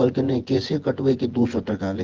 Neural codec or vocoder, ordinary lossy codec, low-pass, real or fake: vocoder, 24 kHz, 100 mel bands, Vocos; Opus, 16 kbps; 7.2 kHz; fake